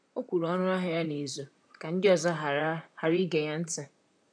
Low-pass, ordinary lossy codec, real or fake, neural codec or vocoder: 9.9 kHz; none; fake; vocoder, 44.1 kHz, 128 mel bands, Pupu-Vocoder